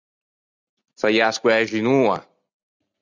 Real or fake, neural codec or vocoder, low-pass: real; none; 7.2 kHz